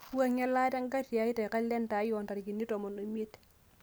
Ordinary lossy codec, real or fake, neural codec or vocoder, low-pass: none; real; none; none